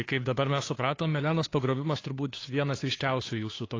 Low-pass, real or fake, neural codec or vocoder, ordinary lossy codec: 7.2 kHz; fake; codec, 16 kHz, 2 kbps, FunCodec, trained on Chinese and English, 25 frames a second; AAC, 32 kbps